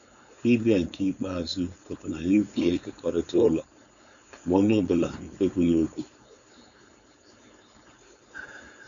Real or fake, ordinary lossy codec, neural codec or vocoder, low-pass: fake; none; codec, 16 kHz, 4.8 kbps, FACodec; 7.2 kHz